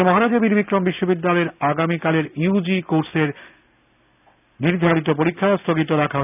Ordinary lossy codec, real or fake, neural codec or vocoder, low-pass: none; real; none; 3.6 kHz